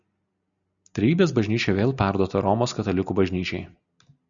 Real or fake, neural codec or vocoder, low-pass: real; none; 7.2 kHz